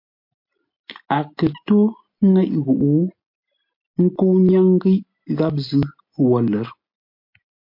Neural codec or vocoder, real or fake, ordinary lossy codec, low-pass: none; real; MP3, 32 kbps; 5.4 kHz